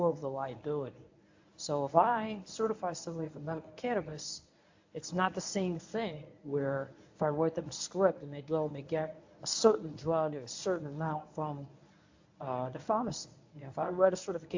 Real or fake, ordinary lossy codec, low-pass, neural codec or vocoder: fake; AAC, 48 kbps; 7.2 kHz; codec, 24 kHz, 0.9 kbps, WavTokenizer, medium speech release version 1